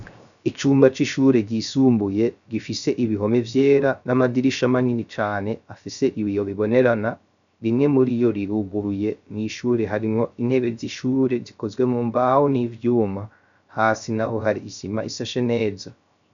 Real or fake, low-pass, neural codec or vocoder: fake; 7.2 kHz; codec, 16 kHz, 0.3 kbps, FocalCodec